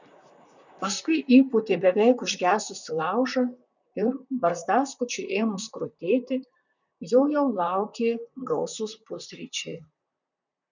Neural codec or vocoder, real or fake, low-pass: vocoder, 44.1 kHz, 128 mel bands, Pupu-Vocoder; fake; 7.2 kHz